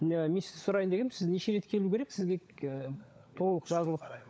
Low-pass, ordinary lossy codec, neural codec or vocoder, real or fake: none; none; codec, 16 kHz, 16 kbps, FunCodec, trained on LibriTTS, 50 frames a second; fake